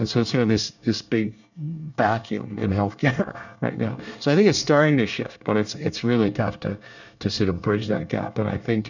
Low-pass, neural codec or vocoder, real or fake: 7.2 kHz; codec, 24 kHz, 1 kbps, SNAC; fake